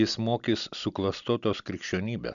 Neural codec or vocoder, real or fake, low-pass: none; real; 7.2 kHz